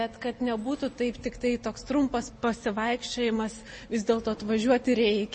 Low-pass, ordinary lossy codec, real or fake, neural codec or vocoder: 9.9 kHz; MP3, 32 kbps; real; none